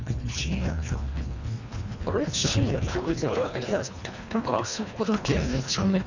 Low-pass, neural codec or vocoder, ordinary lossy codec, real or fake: 7.2 kHz; codec, 24 kHz, 1.5 kbps, HILCodec; none; fake